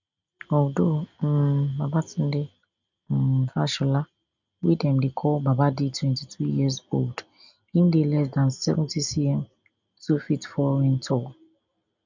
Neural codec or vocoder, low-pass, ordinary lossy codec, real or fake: none; 7.2 kHz; none; real